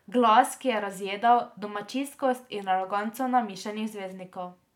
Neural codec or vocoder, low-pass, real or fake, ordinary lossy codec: none; 19.8 kHz; real; none